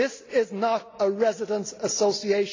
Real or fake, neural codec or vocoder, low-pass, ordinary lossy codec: real; none; 7.2 kHz; AAC, 32 kbps